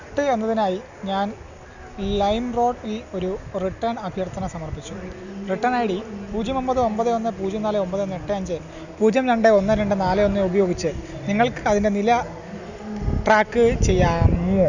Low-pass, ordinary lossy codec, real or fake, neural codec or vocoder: 7.2 kHz; none; real; none